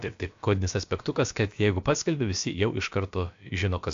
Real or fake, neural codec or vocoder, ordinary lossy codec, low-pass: fake; codec, 16 kHz, about 1 kbps, DyCAST, with the encoder's durations; AAC, 64 kbps; 7.2 kHz